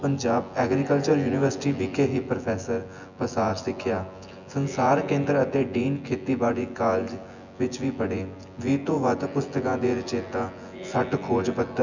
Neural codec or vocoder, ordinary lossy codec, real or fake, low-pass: vocoder, 24 kHz, 100 mel bands, Vocos; none; fake; 7.2 kHz